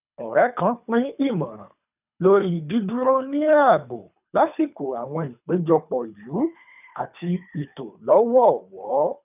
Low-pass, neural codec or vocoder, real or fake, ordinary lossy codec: 3.6 kHz; codec, 24 kHz, 3 kbps, HILCodec; fake; none